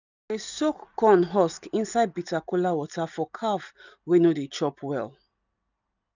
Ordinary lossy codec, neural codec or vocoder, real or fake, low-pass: none; none; real; 7.2 kHz